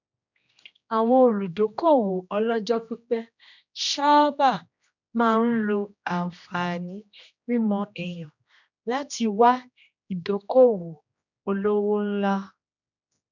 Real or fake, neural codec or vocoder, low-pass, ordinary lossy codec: fake; codec, 16 kHz, 1 kbps, X-Codec, HuBERT features, trained on general audio; 7.2 kHz; none